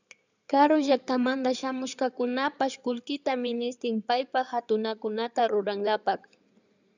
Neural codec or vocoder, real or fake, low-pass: codec, 16 kHz in and 24 kHz out, 2.2 kbps, FireRedTTS-2 codec; fake; 7.2 kHz